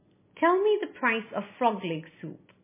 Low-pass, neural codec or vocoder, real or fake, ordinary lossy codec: 3.6 kHz; none; real; MP3, 16 kbps